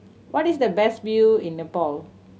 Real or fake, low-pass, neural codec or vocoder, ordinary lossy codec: real; none; none; none